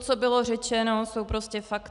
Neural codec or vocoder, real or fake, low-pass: none; real; 10.8 kHz